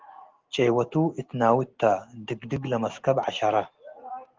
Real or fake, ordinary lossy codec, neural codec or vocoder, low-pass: real; Opus, 16 kbps; none; 7.2 kHz